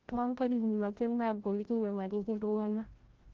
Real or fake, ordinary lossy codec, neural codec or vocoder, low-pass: fake; Opus, 16 kbps; codec, 16 kHz, 0.5 kbps, FreqCodec, larger model; 7.2 kHz